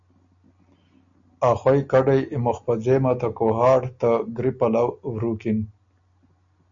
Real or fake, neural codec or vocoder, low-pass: real; none; 7.2 kHz